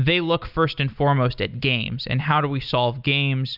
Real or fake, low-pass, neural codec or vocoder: real; 5.4 kHz; none